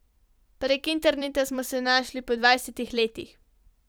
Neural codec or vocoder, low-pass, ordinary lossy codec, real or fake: vocoder, 44.1 kHz, 128 mel bands every 256 samples, BigVGAN v2; none; none; fake